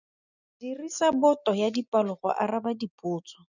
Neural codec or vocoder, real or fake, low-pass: none; real; 7.2 kHz